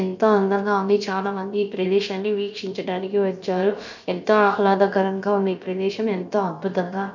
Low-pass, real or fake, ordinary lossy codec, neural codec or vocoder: 7.2 kHz; fake; none; codec, 16 kHz, about 1 kbps, DyCAST, with the encoder's durations